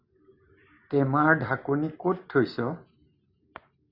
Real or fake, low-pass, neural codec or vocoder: real; 5.4 kHz; none